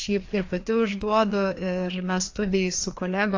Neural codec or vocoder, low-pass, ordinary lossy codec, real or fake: codec, 16 kHz, 2 kbps, FreqCodec, larger model; 7.2 kHz; AAC, 48 kbps; fake